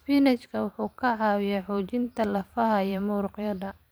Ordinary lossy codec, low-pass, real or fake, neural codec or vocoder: none; none; fake; vocoder, 44.1 kHz, 128 mel bands every 512 samples, BigVGAN v2